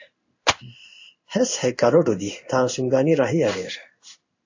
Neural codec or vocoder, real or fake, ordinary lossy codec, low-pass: codec, 16 kHz in and 24 kHz out, 1 kbps, XY-Tokenizer; fake; AAC, 48 kbps; 7.2 kHz